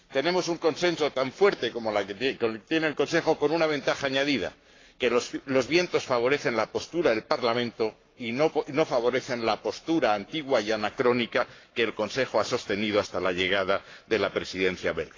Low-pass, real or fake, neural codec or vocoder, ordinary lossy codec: 7.2 kHz; fake; codec, 44.1 kHz, 7.8 kbps, Pupu-Codec; AAC, 32 kbps